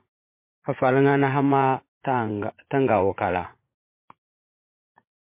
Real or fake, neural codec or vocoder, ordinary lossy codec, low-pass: fake; vocoder, 44.1 kHz, 80 mel bands, Vocos; MP3, 24 kbps; 3.6 kHz